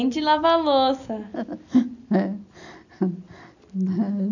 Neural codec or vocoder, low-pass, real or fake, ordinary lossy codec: none; 7.2 kHz; real; none